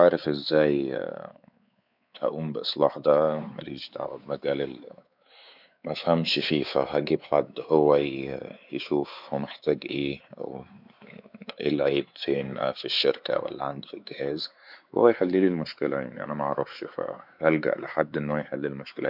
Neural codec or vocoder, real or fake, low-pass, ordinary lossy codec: codec, 16 kHz, 4 kbps, X-Codec, WavLM features, trained on Multilingual LibriSpeech; fake; 5.4 kHz; none